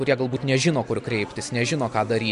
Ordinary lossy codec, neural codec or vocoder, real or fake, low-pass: MP3, 64 kbps; none; real; 10.8 kHz